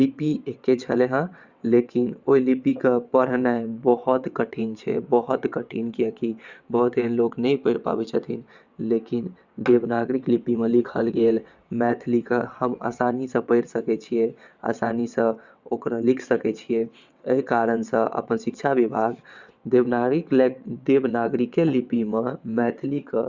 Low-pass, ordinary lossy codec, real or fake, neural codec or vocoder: 7.2 kHz; Opus, 64 kbps; fake; vocoder, 22.05 kHz, 80 mel bands, WaveNeXt